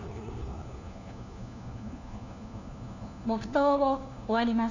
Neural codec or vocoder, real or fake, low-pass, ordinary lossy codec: codec, 16 kHz, 1 kbps, FunCodec, trained on LibriTTS, 50 frames a second; fake; 7.2 kHz; none